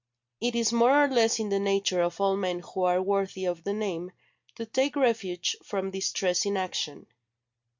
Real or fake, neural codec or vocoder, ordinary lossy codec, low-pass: real; none; MP3, 64 kbps; 7.2 kHz